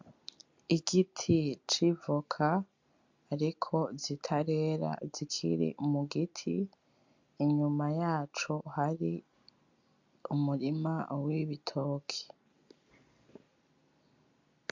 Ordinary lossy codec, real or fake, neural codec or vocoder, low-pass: MP3, 64 kbps; real; none; 7.2 kHz